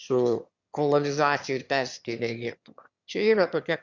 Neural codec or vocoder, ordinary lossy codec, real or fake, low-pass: autoencoder, 22.05 kHz, a latent of 192 numbers a frame, VITS, trained on one speaker; Opus, 64 kbps; fake; 7.2 kHz